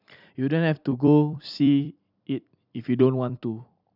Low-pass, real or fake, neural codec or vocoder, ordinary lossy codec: 5.4 kHz; fake; vocoder, 44.1 kHz, 128 mel bands every 256 samples, BigVGAN v2; none